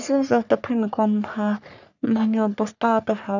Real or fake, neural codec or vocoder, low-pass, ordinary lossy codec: fake; codec, 44.1 kHz, 3.4 kbps, Pupu-Codec; 7.2 kHz; none